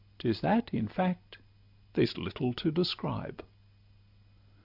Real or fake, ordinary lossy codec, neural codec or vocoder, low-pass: real; AAC, 48 kbps; none; 5.4 kHz